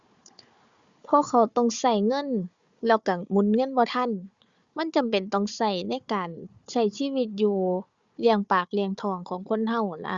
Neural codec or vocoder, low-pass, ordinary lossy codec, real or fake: codec, 16 kHz, 4 kbps, FunCodec, trained on Chinese and English, 50 frames a second; 7.2 kHz; Opus, 64 kbps; fake